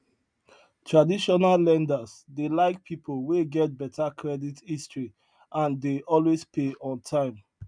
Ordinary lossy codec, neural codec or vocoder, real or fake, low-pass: none; none; real; 9.9 kHz